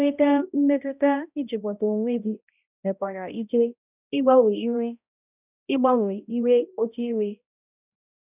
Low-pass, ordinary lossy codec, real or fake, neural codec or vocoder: 3.6 kHz; none; fake; codec, 16 kHz, 0.5 kbps, X-Codec, HuBERT features, trained on balanced general audio